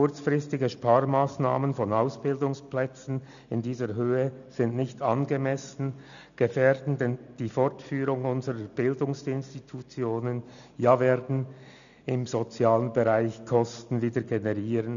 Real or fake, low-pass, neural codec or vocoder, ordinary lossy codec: real; 7.2 kHz; none; none